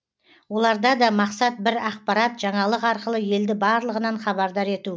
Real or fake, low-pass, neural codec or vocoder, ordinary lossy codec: real; none; none; none